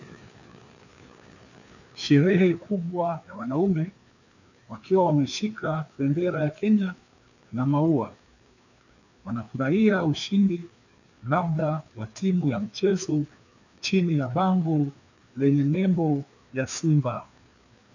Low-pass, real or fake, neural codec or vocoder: 7.2 kHz; fake; codec, 16 kHz, 2 kbps, FreqCodec, larger model